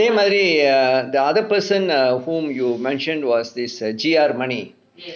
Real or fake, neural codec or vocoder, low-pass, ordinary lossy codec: real; none; none; none